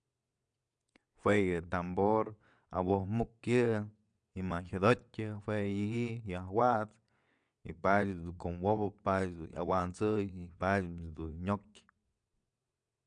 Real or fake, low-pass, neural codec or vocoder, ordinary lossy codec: fake; 9.9 kHz; vocoder, 22.05 kHz, 80 mel bands, WaveNeXt; MP3, 96 kbps